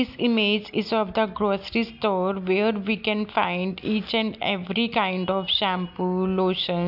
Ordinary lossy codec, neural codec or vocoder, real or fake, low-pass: none; none; real; 5.4 kHz